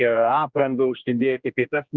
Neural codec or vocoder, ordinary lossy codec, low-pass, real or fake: codec, 16 kHz, 1 kbps, X-Codec, HuBERT features, trained on general audio; Opus, 64 kbps; 7.2 kHz; fake